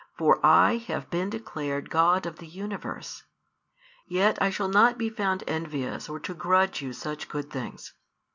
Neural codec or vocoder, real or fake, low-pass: none; real; 7.2 kHz